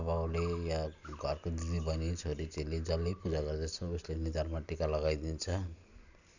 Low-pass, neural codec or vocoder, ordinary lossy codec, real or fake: 7.2 kHz; none; none; real